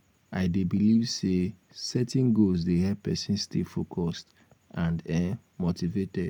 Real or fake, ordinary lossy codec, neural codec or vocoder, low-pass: real; none; none; 19.8 kHz